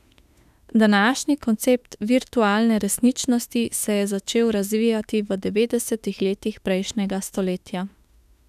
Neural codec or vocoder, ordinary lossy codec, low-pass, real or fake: autoencoder, 48 kHz, 32 numbers a frame, DAC-VAE, trained on Japanese speech; none; 14.4 kHz; fake